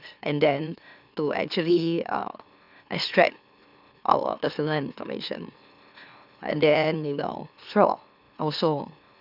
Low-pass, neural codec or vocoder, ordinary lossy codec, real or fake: 5.4 kHz; autoencoder, 44.1 kHz, a latent of 192 numbers a frame, MeloTTS; none; fake